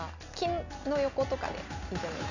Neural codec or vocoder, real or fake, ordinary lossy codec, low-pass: none; real; none; 7.2 kHz